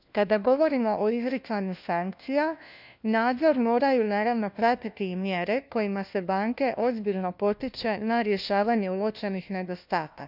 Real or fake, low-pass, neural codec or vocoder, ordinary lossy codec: fake; 5.4 kHz; codec, 16 kHz, 1 kbps, FunCodec, trained on LibriTTS, 50 frames a second; none